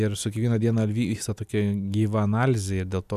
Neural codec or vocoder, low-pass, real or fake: none; 14.4 kHz; real